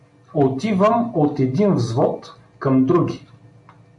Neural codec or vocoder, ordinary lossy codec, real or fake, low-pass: none; MP3, 64 kbps; real; 10.8 kHz